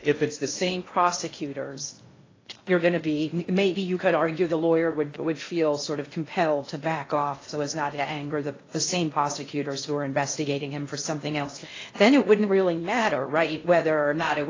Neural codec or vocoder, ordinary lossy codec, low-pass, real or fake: codec, 16 kHz in and 24 kHz out, 0.6 kbps, FocalCodec, streaming, 2048 codes; AAC, 32 kbps; 7.2 kHz; fake